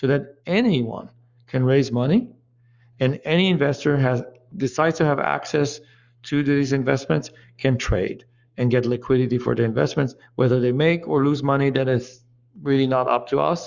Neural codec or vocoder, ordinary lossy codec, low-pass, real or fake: codec, 44.1 kHz, 7.8 kbps, Pupu-Codec; Opus, 64 kbps; 7.2 kHz; fake